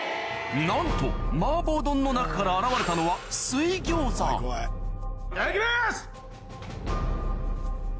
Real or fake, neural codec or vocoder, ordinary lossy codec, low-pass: real; none; none; none